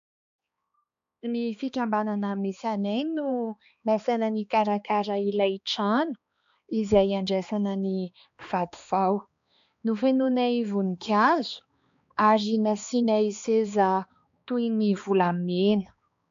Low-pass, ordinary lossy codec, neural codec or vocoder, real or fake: 7.2 kHz; AAC, 64 kbps; codec, 16 kHz, 2 kbps, X-Codec, HuBERT features, trained on balanced general audio; fake